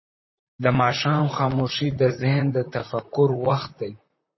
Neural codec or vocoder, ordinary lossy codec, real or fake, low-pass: vocoder, 44.1 kHz, 128 mel bands, Pupu-Vocoder; MP3, 24 kbps; fake; 7.2 kHz